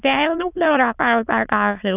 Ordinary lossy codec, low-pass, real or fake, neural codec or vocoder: none; 3.6 kHz; fake; autoencoder, 22.05 kHz, a latent of 192 numbers a frame, VITS, trained on many speakers